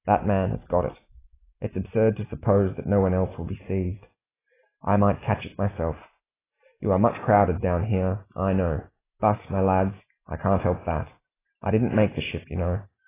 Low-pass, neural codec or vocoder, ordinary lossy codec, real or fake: 3.6 kHz; none; AAC, 16 kbps; real